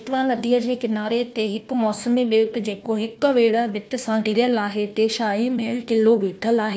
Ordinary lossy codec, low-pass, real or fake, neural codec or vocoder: none; none; fake; codec, 16 kHz, 1 kbps, FunCodec, trained on Chinese and English, 50 frames a second